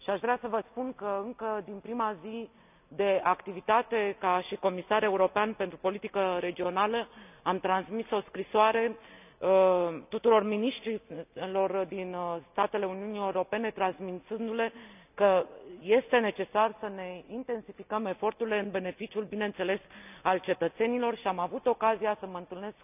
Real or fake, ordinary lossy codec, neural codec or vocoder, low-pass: real; none; none; 3.6 kHz